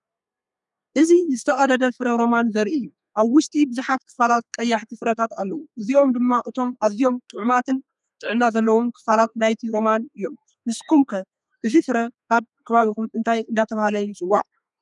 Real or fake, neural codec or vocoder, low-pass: fake; codec, 32 kHz, 1.9 kbps, SNAC; 10.8 kHz